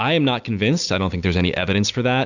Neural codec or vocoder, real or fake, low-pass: none; real; 7.2 kHz